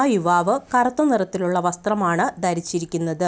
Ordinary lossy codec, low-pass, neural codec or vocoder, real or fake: none; none; none; real